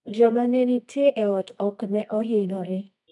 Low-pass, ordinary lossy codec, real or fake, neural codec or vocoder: 10.8 kHz; none; fake; codec, 24 kHz, 0.9 kbps, WavTokenizer, medium music audio release